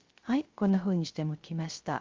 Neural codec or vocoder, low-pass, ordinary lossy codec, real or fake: codec, 16 kHz, 0.3 kbps, FocalCodec; 7.2 kHz; Opus, 32 kbps; fake